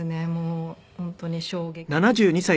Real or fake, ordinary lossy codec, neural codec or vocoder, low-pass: real; none; none; none